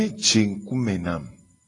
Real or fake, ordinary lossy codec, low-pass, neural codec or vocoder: real; AAC, 32 kbps; 10.8 kHz; none